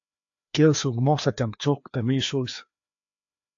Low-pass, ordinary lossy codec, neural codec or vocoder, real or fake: 7.2 kHz; AAC, 48 kbps; codec, 16 kHz, 2 kbps, FreqCodec, larger model; fake